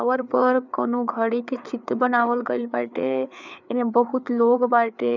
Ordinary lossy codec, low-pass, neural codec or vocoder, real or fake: none; 7.2 kHz; codec, 16 kHz, 4 kbps, FreqCodec, larger model; fake